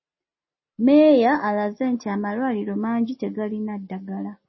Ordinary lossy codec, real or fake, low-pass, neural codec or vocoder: MP3, 24 kbps; real; 7.2 kHz; none